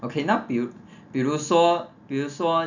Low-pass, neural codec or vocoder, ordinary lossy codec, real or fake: 7.2 kHz; none; none; real